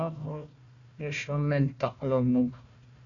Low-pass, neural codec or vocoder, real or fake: 7.2 kHz; codec, 16 kHz, 1 kbps, FunCodec, trained on Chinese and English, 50 frames a second; fake